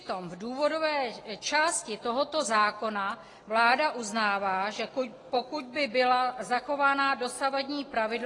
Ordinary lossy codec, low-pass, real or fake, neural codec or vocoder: AAC, 32 kbps; 10.8 kHz; real; none